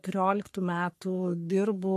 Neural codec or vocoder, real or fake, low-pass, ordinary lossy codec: codec, 44.1 kHz, 3.4 kbps, Pupu-Codec; fake; 14.4 kHz; MP3, 64 kbps